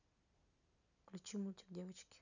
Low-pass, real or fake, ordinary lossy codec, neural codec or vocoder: 7.2 kHz; real; none; none